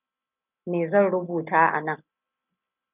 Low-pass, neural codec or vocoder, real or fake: 3.6 kHz; none; real